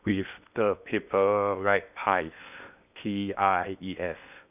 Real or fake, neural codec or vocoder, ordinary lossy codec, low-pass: fake; codec, 16 kHz in and 24 kHz out, 0.8 kbps, FocalCodec, streaming, 65536 codes; none; 3.6 kHz